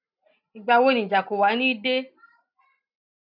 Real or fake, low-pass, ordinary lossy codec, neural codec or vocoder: real; 5.4 kHz; none; none